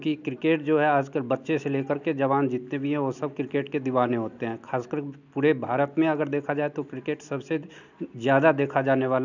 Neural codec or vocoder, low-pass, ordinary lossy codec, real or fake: none; 7.2 kHz; none; real